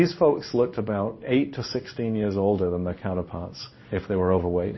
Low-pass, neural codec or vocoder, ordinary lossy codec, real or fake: 7.2 kHz; codec, 16 kHz in and 24 kHz out, 1 kbps, XY-Tokenizer; MP3, 24 kbps; fake